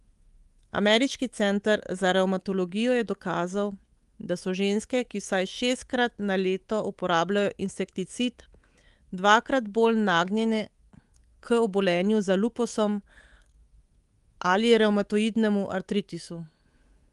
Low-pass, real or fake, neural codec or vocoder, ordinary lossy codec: 10.8 kHz; fake; codec, 24 kHz, 3.1 kbps, DualCodec; Opus, 24 kbps